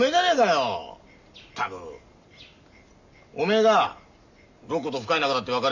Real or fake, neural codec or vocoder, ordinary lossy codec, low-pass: real; none; none; 7.2 kHz